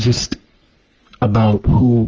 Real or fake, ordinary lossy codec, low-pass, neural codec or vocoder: fake; Opus, 24 kbps; 7.2 kHz; codec, 44.1 kHz, 3.4 kbps, Pupu-Codec